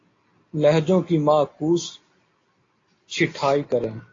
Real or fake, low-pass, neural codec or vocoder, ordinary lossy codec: real; 7.2 kHz; none; AAC, 32 kbps